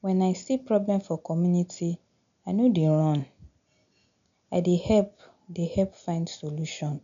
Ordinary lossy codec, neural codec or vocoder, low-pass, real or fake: none; none; 7.2 kHz; real